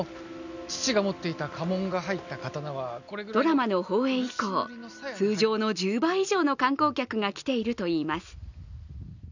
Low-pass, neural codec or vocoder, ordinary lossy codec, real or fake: 7.2 kHz; none; none; real